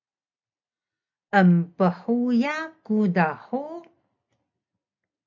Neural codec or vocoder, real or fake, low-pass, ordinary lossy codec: none; real; 7.2 kHz; MP3, 64 kbps